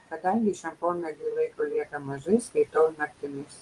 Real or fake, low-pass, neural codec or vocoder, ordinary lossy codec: fake; 10.8 kHz; vocoder, 24 kHz, 100 mel bands, Vocos; Opus, 24 kbps